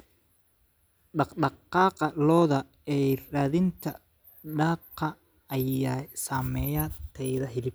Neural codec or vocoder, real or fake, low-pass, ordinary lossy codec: none; real; none; none